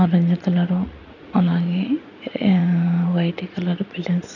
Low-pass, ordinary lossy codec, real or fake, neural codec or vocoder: 7.2 kHz; none; fake; vocoder, 44.1 kHz, 80 mel bands, Vocos